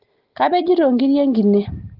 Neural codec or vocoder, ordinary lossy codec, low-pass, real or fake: none; Opus, 16 kbps; 5.4 kHz; real